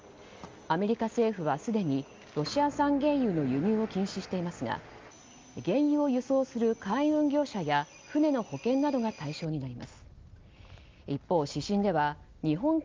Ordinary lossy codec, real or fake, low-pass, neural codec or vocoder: Opus, 32 kbps; real; 7.2 kHz; none